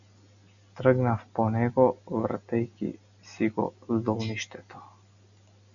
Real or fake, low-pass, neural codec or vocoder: real; 7.2 kHz; none